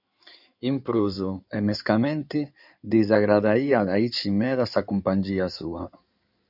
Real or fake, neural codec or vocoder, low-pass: fake; codec, 16 kHz in and 24 kHz out, 2.2 kbps, FireRedTTS-2 codec; 5.4 kHz